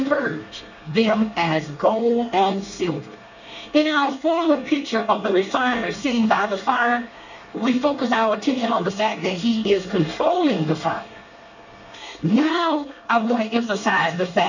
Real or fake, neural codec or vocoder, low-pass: fake; codec, 24 kHz, 1 kbps, SNAC; 7.2 kHz